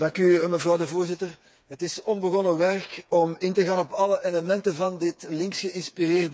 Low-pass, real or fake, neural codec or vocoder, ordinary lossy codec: none; fake; codec, 16 kHz, 4 kbps, FreqCodec, smaller model; none